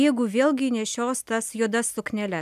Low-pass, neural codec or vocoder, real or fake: 14.4 kHz; none; real